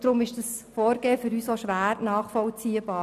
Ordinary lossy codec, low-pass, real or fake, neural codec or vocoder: none; 14.4 kHz; real; none